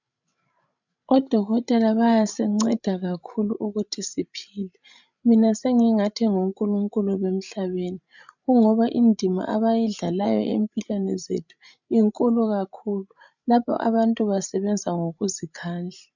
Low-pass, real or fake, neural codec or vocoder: 7.2 kHz; fake; codec, 16 kHz, 16 kbps, FreqCodec, larger model